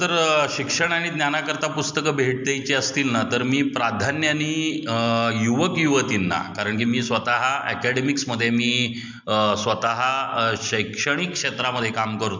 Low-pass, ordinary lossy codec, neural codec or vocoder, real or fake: 7.2 kHz; MP3, 64 kbps; none; real